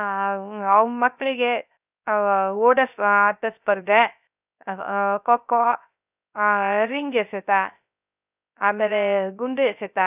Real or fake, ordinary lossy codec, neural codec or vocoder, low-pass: fake; none; codec, 16 kHz, 0.3 kbps, FocalCodec; 3.6 kHz